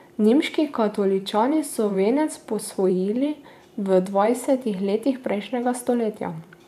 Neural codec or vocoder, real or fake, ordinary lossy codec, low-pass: vocoder, 44.1 kHz, 128 mel bands every 512 samples, BigVGAN v2; fake; AAC, 96 kbps; 14.4 kHz